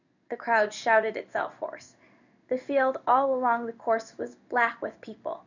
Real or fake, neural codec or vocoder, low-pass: fake; codec, 16 kHz in and 24 kHz out, 1 kbps, XY-Tokenizer; 7.2 kHz